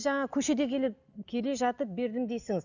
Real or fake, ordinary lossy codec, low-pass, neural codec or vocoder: real; none; 7.2 kHz; none